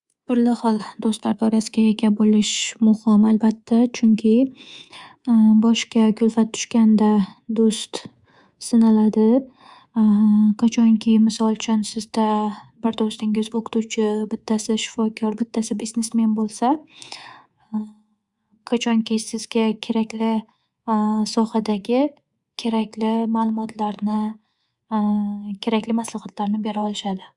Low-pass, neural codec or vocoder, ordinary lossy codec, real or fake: 10.8 kHz; codec, 24 kHz, 3.1 kbps, DualCodec; Opus, 64 kbps; fake